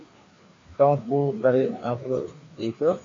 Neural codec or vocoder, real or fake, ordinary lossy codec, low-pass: codec, 16 kHz, 2 kbps, FreqCodec, larger model; fake; AAC, 32 kbps; 7.2 kHz